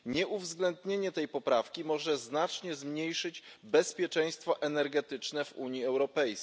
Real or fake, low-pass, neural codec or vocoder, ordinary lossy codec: real; none; none; none